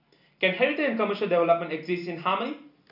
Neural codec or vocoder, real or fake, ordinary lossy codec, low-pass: none; real; none; 5.4 kHz